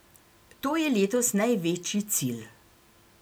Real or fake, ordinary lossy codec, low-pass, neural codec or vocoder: real; none; none; none